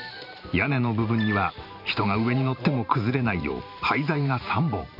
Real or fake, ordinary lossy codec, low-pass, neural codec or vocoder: fake; none; 5.4 kHz; vocoder, 44.1 kHz, 128 mel bands every 512 samples, BigVGAN v2